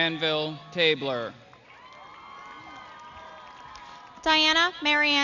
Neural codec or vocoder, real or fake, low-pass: none; real; 7.2 kHz